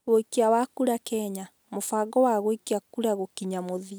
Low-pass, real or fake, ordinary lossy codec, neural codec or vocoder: none; real; none; none